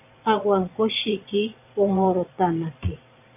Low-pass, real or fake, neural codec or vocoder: 3.6 kHz; fake; vocoder, 22.05 kHz, 80 mel bands, Vocos